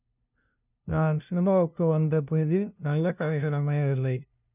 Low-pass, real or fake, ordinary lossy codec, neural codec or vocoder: 3.6 kHz; fake; none; codec, 16 kHz, 0.5 kbps, FunCodec, trained on LibriTTS, 25 frames a second